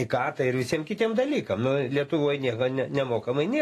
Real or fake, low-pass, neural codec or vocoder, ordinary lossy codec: real; 14.4 kHz; none; AAC, 48 kbps